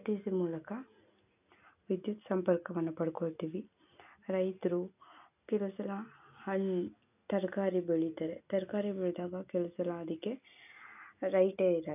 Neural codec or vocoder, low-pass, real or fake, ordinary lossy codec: none; 3.6 kHz; real; none